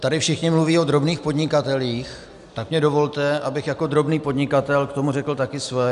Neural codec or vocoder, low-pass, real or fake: none; 10.8 kHz; real